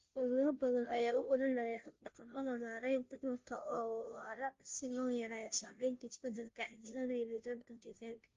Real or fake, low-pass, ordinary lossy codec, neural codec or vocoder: fake; 7.2 kHz; Opus, 32 kbps; codec, 16 kHz, 0.5 kbps, FunCodec, trained on Chinese and English, 25 frames a second